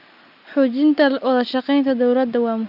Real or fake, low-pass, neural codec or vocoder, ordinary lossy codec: real; 5.4 kHz; none; Opus, 64 kbps